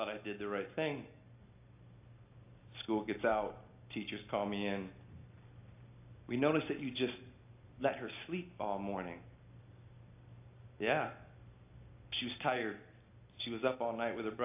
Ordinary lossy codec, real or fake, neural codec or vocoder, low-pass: AAC, 32 kbps; real; none; 3.6 kHz